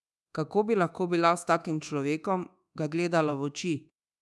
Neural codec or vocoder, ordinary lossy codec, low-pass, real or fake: codec, 24 kHz, 1.2 kbps, DualCodec; none; none; fake